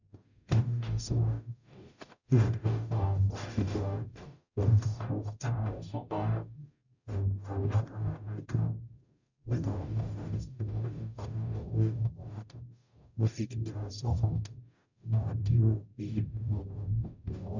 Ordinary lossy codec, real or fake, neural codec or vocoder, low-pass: none; fake; codec, 44.1 kHz, 0.9 kbps, DAC; 7.2 kHz